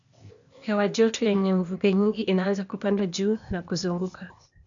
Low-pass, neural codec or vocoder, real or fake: 7.2 kHz; codec, 16 kHz, 0.8 kbps, ZipCodec; fake